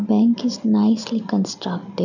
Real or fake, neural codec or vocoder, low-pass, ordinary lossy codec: real; none; 7.2 kHz; none